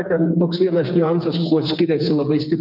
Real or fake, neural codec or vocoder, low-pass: fake; codec, 16 kHz, 4 kbps, FreqCodec, smaller model; 5.4 kHz